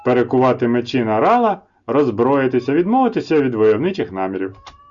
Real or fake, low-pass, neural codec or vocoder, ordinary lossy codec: real; 7.2 kHz; none; Opus, 64 kbps